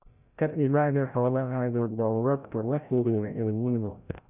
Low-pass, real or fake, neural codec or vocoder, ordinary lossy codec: 3.6 kHz; fake; codec, 16 kHz, 0.5 kbps, FreqCodec, larger model; none